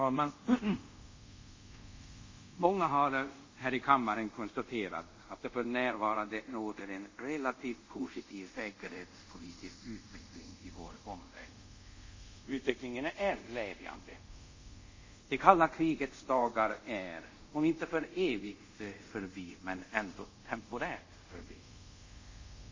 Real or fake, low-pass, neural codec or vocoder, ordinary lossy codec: fake; 7.2 kHz; codec, 24 kHz, 0.5 kbps, DualCodec; MP3, 32 kbps